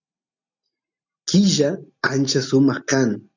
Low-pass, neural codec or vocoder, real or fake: 7.2 kHz; none; real